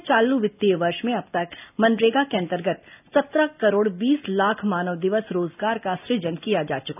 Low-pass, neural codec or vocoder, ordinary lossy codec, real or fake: 3.6 kHz; none; none; real